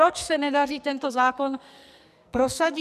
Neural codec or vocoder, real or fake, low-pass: codec, 44.1 kHz, 2.6 kbps, SNAC; fake; 14.4 kHz